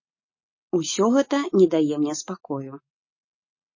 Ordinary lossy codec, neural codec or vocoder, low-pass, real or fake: MP3, 32 kbps; none; 7.2 kHz; real